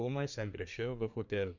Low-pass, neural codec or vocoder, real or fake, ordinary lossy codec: 7.2 kHz; codec, 24 kHz, 1 kbps, SNAC; fake; none